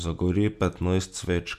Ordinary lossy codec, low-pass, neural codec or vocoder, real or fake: none; 14.4 kHz; none; real